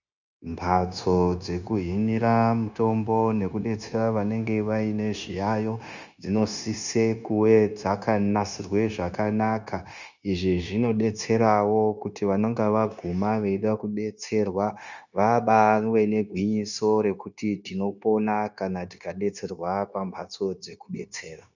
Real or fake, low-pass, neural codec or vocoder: fake; 7.2 kHz; codec, 16 kHz, 0.9 kbps, LongCat-Audio-Codec